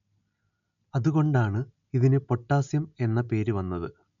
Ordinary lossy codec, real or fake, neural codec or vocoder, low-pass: none; real; none; 7.2 kHz